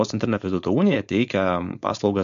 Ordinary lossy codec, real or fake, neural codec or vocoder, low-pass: MP3, 64 kbps; fake; codec, 16 kHz, 4.8 kbps, FACodec; 7.2 kHz